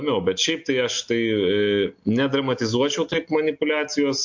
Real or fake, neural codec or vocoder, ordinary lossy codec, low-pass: real; none; MP3, 48 kbps; 7.2 kHz